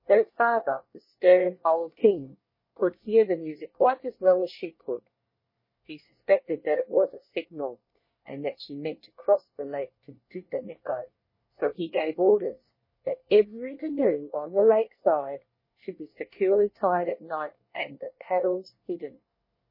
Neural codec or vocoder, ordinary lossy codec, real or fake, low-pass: codec, 24 kHz, 1 kbps, SNAC; MP3, 24 kbps; fake; 5.4 kHz